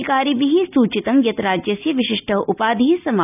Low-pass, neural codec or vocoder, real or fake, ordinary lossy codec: 3.6 kHz; none; real; none